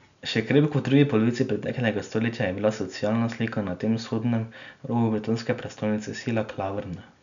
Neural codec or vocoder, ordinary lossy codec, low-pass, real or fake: none; none; 7.2 kHz; real